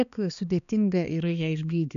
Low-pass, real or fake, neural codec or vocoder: 7.2 kHz; fake; codec, 16 kHz, 2 kbps, X-Codec, HuBERT features, trained on balanced general audio